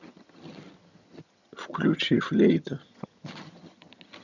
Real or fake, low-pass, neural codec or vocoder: fake; 7.2 kHz; vocoder, 22.05 kHz, 80 mel bands, HiFi-GAN